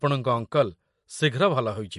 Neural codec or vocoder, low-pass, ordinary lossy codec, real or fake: none; 14.4 kHz; MP3, 48 kbps; real